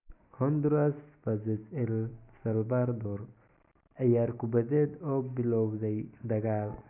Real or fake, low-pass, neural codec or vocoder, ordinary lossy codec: real; 3.6 kHz; none; none